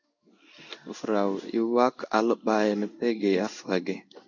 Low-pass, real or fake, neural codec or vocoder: 7.2 kHz; fake; codec, 16 kHz in and 24 kHz out, 1 kbps, XY-Tokenizer